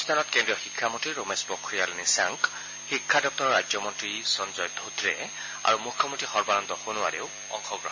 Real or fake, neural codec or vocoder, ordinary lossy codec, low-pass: real; none; MP3, 32 kbps; 7.2 kHz